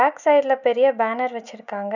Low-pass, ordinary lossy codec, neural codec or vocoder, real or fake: 7.2 kHz; none; none; real